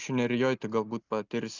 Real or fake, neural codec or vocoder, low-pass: real; none; 7.2 kHz